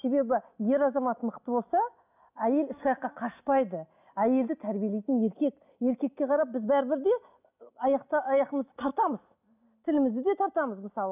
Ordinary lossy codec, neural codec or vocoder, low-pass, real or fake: none; none; 3.6 kHz; real